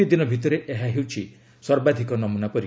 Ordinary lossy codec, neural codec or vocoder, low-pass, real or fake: none; none; none; real